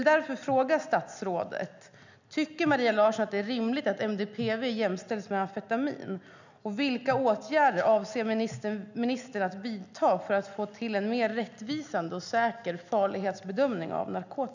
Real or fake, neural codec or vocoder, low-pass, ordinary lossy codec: real; none; 7.2 kHz; none